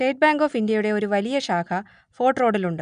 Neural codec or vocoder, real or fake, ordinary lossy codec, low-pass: none; real; none; 9.9 kHz